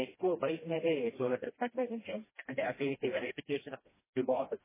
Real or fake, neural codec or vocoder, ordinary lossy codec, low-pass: fake; codec, 16 kHz, 1 kbps, FreqCodec, smaller model; MP3, 16 kbps; 3.6 kHz